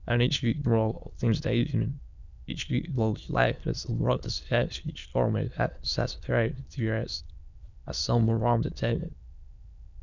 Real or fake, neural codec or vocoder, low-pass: fake; autoencoder, 22.05 kHz, a latent of 192 numbers a frame, VITS, trained on many speakers; 7.2 kHz